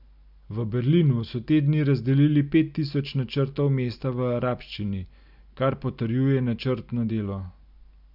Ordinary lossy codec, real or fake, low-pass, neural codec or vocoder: none; real; 5.4 kHz; none